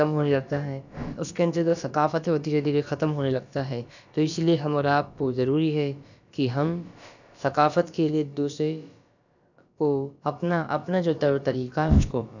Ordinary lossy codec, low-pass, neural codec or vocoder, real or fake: none; 7.2 kHz; codec, 16 kHz, about 1 kbps, DyCAST, with the encoder's durations; fake